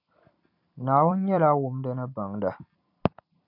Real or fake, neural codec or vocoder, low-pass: fake; vocoder, 44.1 kHz, 128 mel bands every 256 samples, BigVGAN v2; 5.4 kHz